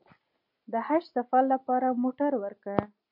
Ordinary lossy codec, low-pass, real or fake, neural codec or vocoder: MP3, 32 kbps; 5.4 kHz; real; none